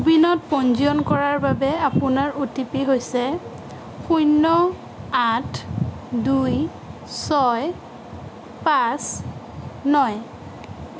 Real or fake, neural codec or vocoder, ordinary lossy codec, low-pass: real; none; none; none